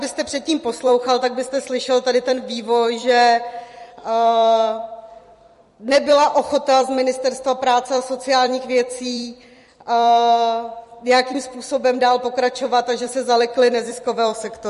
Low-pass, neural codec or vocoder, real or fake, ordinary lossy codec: 14.4 kHz; vocoder, 44.1 kHz, 128 mel bands every 256 samples, BigVGAN v2; fake; MP3, 48 kbps